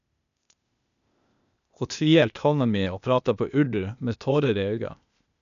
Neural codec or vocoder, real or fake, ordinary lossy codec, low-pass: codec, 16 kHz, 0.8 kbps, ZipCodec; fake; none; 7.2 kHz